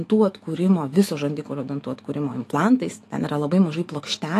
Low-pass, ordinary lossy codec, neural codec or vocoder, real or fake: 14.4 kHz; AAC, 48 kbps; autoencoder, 48 kHz, 128 numbers a frame, DAC-VAE, trained on Japanese speech; fake